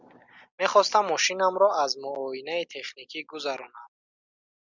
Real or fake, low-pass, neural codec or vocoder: real; 7.2 kHz; none